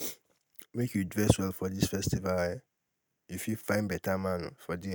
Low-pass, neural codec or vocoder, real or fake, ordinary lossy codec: none; none; real; none